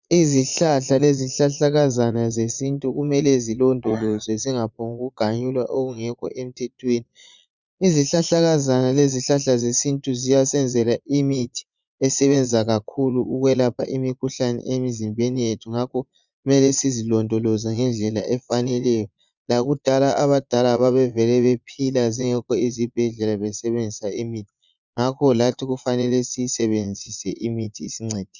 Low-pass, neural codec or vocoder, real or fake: 7.2 kHz; vocoder, 22.05 kHz, 80 mel bands, Vocos; fake